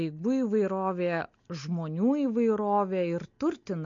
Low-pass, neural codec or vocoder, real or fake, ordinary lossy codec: 7.2 kHz; none; real; MP3, 48 kbps